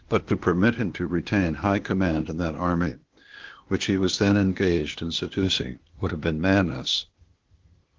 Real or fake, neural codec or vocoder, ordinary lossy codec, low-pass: fake; codec, 16 kHz, 0.8 kbps, ZipCodec; Opus, 16 kbps; 7.2 kHz